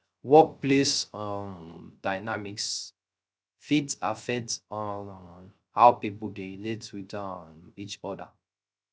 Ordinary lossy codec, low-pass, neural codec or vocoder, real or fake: none; none; codec, 16 kHz, 0.3 kbps, FocalCodec; fake